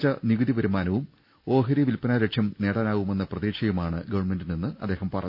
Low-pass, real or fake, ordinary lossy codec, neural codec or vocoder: 5.4 kHz; real; none; none